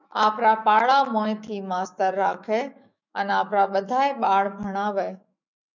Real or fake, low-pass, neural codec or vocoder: fake; 7.2 kHz; autoencoder, 48 kHz, 128 numbers a frame, DAC-VAE, trained on Japanese speech